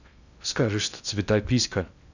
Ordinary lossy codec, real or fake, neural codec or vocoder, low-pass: none; fake; codec, 16 kHz in and 24 kHz out, 0.6 kbps, FocalCodec, streaming, 2048 codes; 7.2 kHz